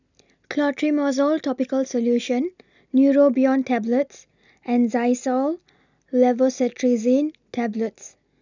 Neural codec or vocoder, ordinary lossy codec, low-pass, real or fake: none; none; 7.2 kHz; real